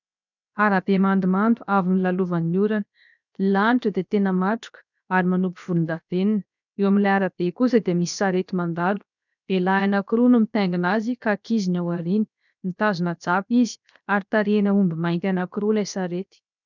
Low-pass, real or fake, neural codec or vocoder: 7.2 kHz; fake; codec, 16 kHz, 0.7 kbps, FocalCodec